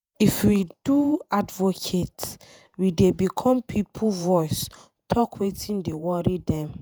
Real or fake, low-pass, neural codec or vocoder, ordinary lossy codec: fake; none; vocoder, 48 kHz, 128 mel bands, Vocos; none